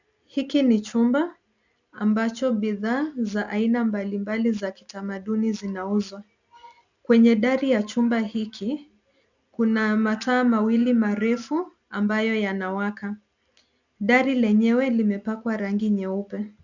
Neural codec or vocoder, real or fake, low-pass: none; real; 7.2 kHz